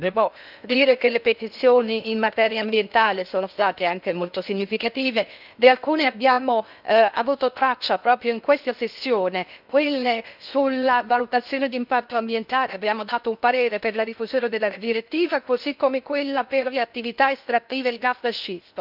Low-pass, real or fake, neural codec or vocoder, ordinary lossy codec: 5.4 kHz; fake; codec, 16 kHz in and 24 kHz out, 0.6 kbps, FocalCodec, streaming, 2048 codes; none